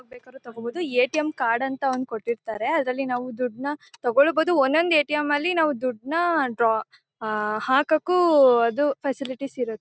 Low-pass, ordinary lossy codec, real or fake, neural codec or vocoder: none; none; real; none